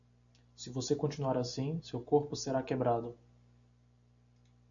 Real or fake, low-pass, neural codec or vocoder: real; 7.2 kHz; none